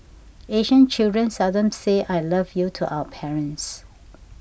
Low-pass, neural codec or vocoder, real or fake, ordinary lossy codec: none; none; real; none